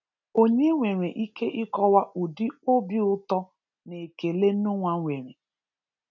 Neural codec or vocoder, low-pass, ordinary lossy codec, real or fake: none; 7.2 kHz; none; real